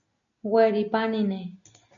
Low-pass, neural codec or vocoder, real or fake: 7.2 kHz; none; real